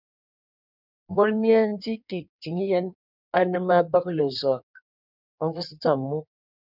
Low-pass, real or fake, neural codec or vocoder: 5.4 kHz; fake; codec, 16 kHz in and 24 kHz out, 1.1 kbps, FireRedTTS-2 codec